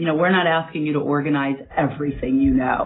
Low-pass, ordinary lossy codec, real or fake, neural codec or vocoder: 7.2 kHz; AAC, 16 kbps; real; none